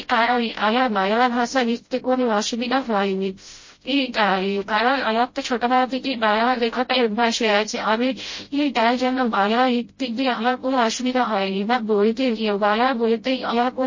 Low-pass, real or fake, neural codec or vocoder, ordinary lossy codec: 7.2 kHz; fake; codec, 16 kHz, 0.5 kbps, FreqCodec, smaller model; MP3, 32 kbps